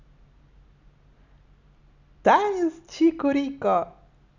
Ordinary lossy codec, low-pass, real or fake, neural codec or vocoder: none; 7.2 kHz; real; none